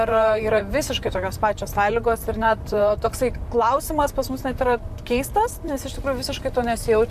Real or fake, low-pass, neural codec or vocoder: fake; 14.4 kHz; vocoder, 44.1 kHz, 128 mel bands, Pupu-Vocoder